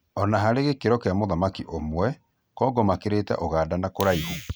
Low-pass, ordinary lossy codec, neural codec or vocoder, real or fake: none; none; none; real